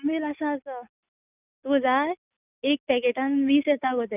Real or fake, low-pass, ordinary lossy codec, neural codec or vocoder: real; 3.6 kHz; none; none